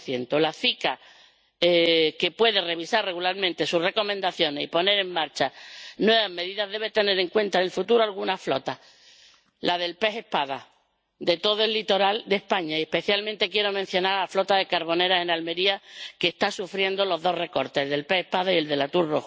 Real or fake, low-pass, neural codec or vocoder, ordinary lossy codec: real; none; none; none